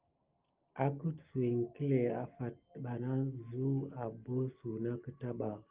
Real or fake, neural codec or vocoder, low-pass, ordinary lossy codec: real; none; 3.6 kHz; Opus, 32 kbps